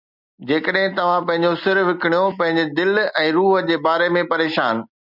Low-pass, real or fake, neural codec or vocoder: 5.4 kHz; real; none